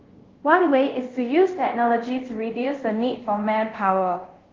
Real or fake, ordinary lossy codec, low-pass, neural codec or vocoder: fake; Opus, 16 kbps; 7.2 kHz; codec, 24 kHz, 0.5 kbps, DualCodec